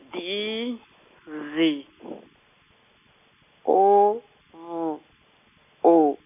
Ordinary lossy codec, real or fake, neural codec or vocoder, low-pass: none; real; none; 3.6 kHz